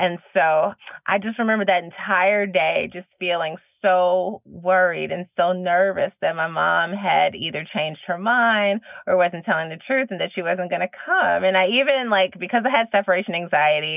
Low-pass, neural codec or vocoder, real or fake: 3.6 kHz; none; real